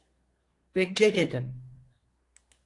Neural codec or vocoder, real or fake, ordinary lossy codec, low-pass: codec, 24 kHz, 1 kbps, SNAC; fake; AAC, 48 kbps; 10.8 kHz